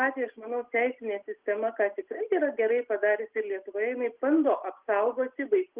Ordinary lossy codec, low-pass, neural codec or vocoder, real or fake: Opus, 24 kbps; 3.6 kHz; none; real